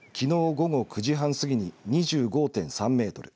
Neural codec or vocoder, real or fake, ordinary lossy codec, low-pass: none; real; none; none